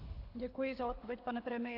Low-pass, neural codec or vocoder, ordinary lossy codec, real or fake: 5.4 kHz; codec, 24 kHz, 6 kbps, HILCodec; AAC, 32 kbps; fake